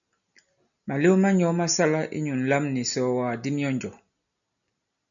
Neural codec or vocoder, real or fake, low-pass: none; real; 7.2 kHz